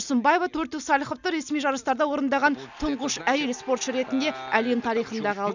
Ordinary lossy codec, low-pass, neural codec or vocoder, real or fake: none; 7.2 kHz; none; real